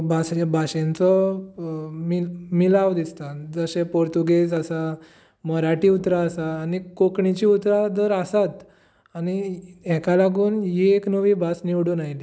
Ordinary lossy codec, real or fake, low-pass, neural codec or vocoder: none; real; none; none